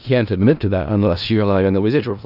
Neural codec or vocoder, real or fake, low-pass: codec, 16 kHz in and 24 kHz out, 0.4 kbps, LongCat-Audio-Codec, four codebook decoder; fake; 5.4 kHz